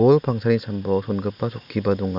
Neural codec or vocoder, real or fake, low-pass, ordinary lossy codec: none; real; 5.4 kHz; none